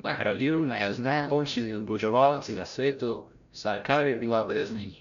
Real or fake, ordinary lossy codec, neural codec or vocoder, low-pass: fake; none; codec, 16 kHz, 0.5 kbps, FreqCodec, larger model; 7.2 kHz